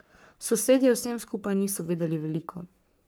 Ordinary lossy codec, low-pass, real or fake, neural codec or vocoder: none; none; fake; codec, 44.1 kHz, 3.4 kbps, Pupu-Codec